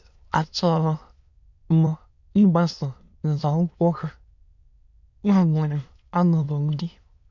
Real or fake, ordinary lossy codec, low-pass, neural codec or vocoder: fake; none; 7.2 kHz; autoencoder, 22.05 kHz, a latent of 192 numbers a frame, VITS, trained on many speakers